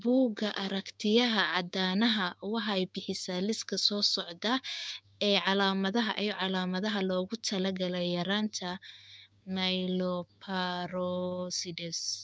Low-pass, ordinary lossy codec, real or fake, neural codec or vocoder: none; none; fake; codec, 16 kHz, 6 kbps, DAC